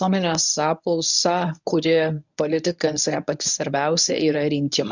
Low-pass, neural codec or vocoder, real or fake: 7.2 kHz; codec, 24 kHz, 0.9 kbps, WavTokenizer, medium speech release version 1; fake